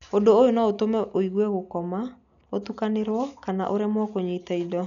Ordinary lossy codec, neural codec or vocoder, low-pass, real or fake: MP3, 96 kbps; none; 7.2 kHz; real